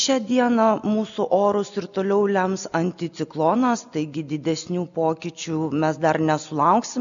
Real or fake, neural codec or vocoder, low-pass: real; none; 7.2 kHz